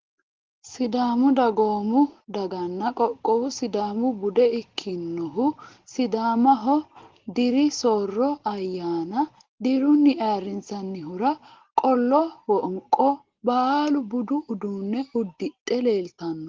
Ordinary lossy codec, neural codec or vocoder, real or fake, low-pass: Opus, 16 kbps; none; real; 7.2 kHz